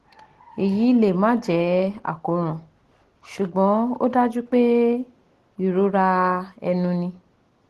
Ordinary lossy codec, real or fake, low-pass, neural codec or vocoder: Opus, 16 kbps; real; 14.4 kHz; none